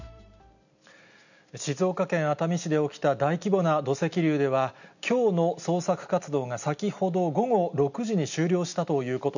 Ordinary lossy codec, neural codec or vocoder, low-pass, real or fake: none; none; 7.2 kHz; real